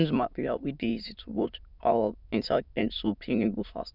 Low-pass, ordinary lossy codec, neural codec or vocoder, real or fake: 5.4 kHz; none; autoencoder, 22.05 kHz, a latent of 192 numbers a frame, VITS, trained on many speakers; fake